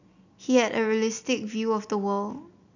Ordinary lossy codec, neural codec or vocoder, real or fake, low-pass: none; none; real; 7.2 kHz